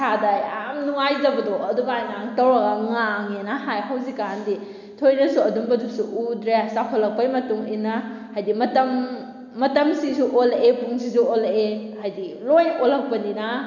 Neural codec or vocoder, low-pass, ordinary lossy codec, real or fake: none; 7.2 kHz; MP3, 64 kbps; real